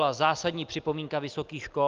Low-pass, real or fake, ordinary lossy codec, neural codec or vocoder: 7.2 kHz; real; Opus, 32 kbps; none